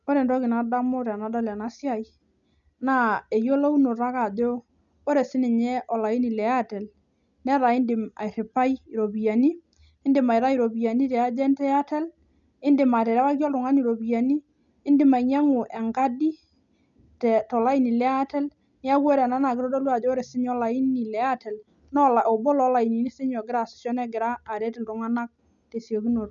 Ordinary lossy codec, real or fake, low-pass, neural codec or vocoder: none; real; 7.2 kHz; none